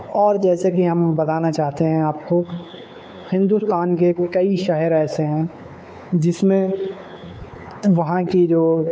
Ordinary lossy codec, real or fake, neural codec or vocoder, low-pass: none; fake; codec, 16 kHz, 4 kbps, X-Codec, WavLM features, trained on Multilingual LibriSpeech; none